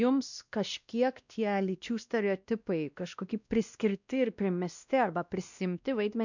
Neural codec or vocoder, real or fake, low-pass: codec, 16 kHz, 1 kbps, X-Codec, WavLM features, trained on Multilingual LibriSpeech; fake; 7.2 kHz